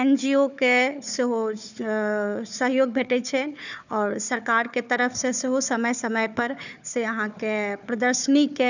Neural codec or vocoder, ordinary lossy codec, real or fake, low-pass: codec, 16 kHz, 4 kbps, FunCodec, trained on Chinese and English, 50 frames a second; none; fake; 7.2 kHz